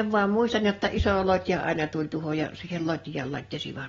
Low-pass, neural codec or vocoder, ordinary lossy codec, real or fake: 7.2 kHz; none; AAC, 24 kbps; real